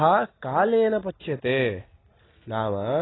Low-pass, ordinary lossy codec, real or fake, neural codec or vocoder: 7.2 kHz; AAC, 16 kbps; real; none